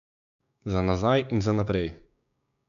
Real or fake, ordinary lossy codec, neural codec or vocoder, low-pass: fake; MP3, 96 kbps; codec, 16 kHz, 6 kbps, DAC; 7.2 kHz